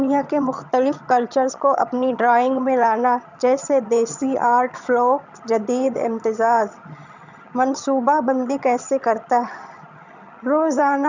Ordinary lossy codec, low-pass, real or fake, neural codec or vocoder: none; 7.2 kHz; fake; vocoder, 22.05 kHz, 80 mel bands, HiFi-GAN